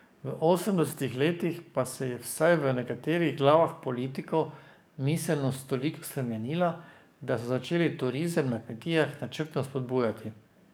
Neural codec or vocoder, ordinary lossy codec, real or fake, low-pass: codec, 44.1 kHz, 7.8 kbps, DAC; none; fake; none